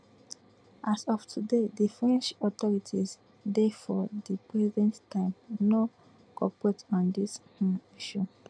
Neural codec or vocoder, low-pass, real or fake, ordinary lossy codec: none; 9.9 kHz; real; none